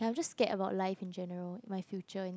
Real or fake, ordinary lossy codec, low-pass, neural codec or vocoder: real; none; none; none